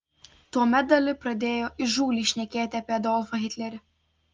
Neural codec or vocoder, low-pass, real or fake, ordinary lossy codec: none; 7.2 kHz; real; Opus, 32 kbps